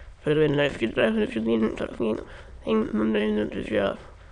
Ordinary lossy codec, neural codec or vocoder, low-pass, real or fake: none; autoencoder, 22.05 kHz, a latent of 192 numbers a frame, VITS, trained on many speakers; 9.9 kHz; fake